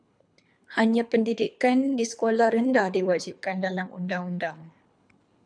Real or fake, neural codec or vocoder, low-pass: fake; codec, 24 kHz, 3 kbps, HILCodec; 9.9 kHz